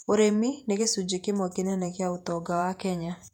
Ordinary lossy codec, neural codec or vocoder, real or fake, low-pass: none; vocoder, 48 kHz, 128 mel bands, Vocos; fake; 19.8 kHz